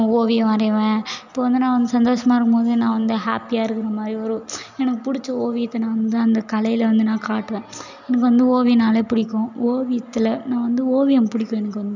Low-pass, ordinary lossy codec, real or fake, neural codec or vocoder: 7.2 kHz; none; real; none